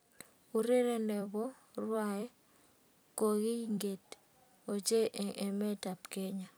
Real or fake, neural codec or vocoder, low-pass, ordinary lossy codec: fake; vocoder, 44.1 kHz, 128 mel bands every 256 samples, BigVGAN v2; none; none